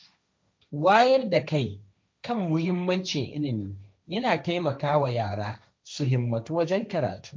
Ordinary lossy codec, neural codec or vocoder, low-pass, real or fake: none; codec, 16 kHz, 1.1 kbps, Voila-Tokenizer; none; fake